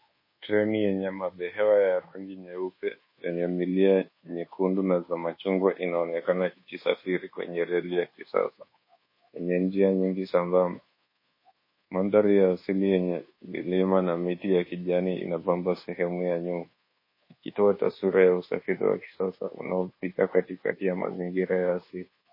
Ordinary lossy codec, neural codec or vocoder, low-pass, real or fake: MP3, 24 kbps; codec, 24 kHz, 1.2 kbps, DualCodec; 5.4 kHz; fake